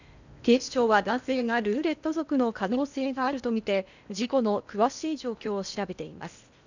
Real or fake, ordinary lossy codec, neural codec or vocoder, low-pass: fake; none; codec, 16 kHz in and 24 kHz out, 0.8 kbps, FocalCodec, streaming, 65536 codes; 7.2 kHz